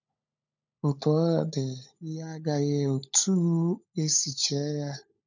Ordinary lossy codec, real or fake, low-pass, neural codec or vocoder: none; fake; 7.2 kHz; codec, 16 kHz, 16 kbps, FunCodec, trained on LibriTTS, 50 frames a second